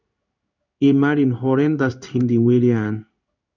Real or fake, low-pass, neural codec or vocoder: fake; 7.2 kHz; codec, 16 kHz in and 24 kHz out, 1 kbps, XY-Tokenizer